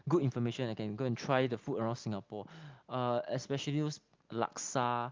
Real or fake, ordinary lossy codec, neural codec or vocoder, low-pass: real; Opus, 32 kbps; none; 7.2 kHz